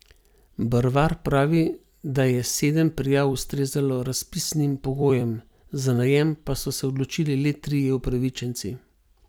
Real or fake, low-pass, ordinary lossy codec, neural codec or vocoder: fake; none; none; vocoder, 44.1 kHz, 128 mel bands every 256 samples, BigVGAN v2